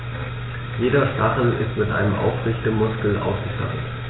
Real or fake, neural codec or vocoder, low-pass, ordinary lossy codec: real; none; 7.2 kHz; AAC, 16 kbps